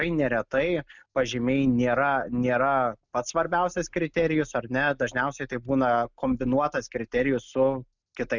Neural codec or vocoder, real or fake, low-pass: none; real; 7.2 kHz